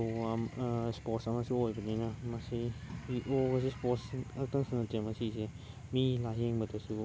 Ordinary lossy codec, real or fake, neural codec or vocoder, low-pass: none; real; none; none